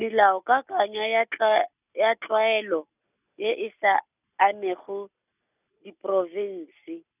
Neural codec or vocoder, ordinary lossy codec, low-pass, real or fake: none; none; 3.6 kHz; real